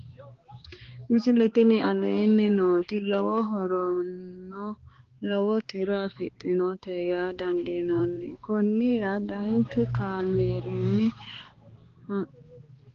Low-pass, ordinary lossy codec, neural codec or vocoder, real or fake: 7.2 kHz; Opus, 16 kbps; codec, 16 kHz, 2 kbps, X-Codec, HuBERT features, trained on balanced general audio; fake